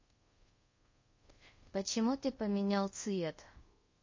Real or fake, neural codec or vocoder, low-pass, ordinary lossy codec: fake; codec, 24 kHz, 0.5 kbps, DualCodec; 7.2 kHz; MP3, 32 kbps